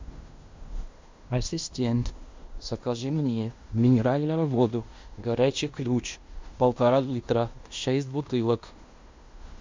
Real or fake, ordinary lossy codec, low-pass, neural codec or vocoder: fake; MP3, 64 kbps; 7.2 kHz; codec, 16 kHz in and 24 kHz out, 0.9 kbps, LongCat-Audio-Codec, fine tuned four codebook decoder